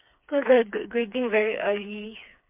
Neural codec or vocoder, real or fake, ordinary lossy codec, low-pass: codec, 16 kHz, 4 kbps, FreqCodec, smaller model; fake; MP3, 32 kbps; 3.6 kHz